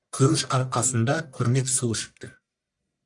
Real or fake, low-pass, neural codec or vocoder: fake; 10.8 kHz; codec, 44.1 kHz, 1.7 kbps, Pupu-Codec